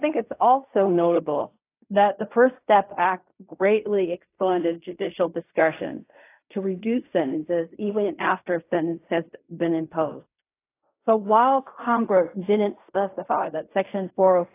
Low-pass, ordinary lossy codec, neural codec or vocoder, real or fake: 3.6 kHz; AAC, 24 kbps; codec, 16 kHz in and 24 kHz out, 0.4 kbps, LongCat-Audio-Codec, fine tuned four codebook decoder; fake